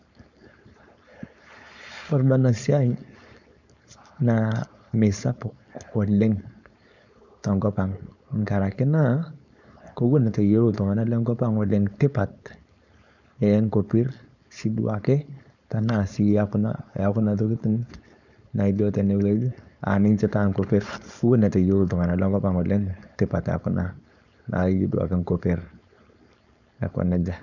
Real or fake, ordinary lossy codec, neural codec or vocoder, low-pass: fake; none; codec, 16 kHz, 4.8 kbps, FACodec; 7.2 kHz